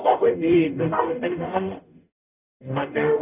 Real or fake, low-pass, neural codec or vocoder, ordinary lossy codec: fake; 3.6 kHz; codec, 44.1 kHz, 0.9 kbps, DAC; none